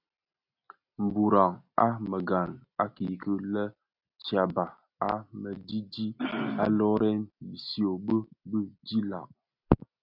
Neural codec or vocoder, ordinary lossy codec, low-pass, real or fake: none; MP3, 48 kbps; 5.4 kHz; real